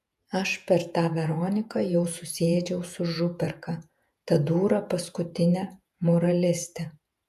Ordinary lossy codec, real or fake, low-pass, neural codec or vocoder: AAC, 96 kbps; fake; 14.4 kHz; vocoder, 48 kHz, 128 mel bands, Vocos